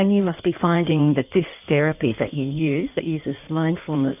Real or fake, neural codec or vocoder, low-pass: fake; codec, 16 kHz in and 24 kHz out, 1.1 kbps, FireRedTTS-2 codec; 3.6 kHz